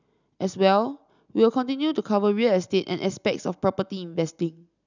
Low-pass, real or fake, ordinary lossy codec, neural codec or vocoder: 7.2 kHz; real; none; none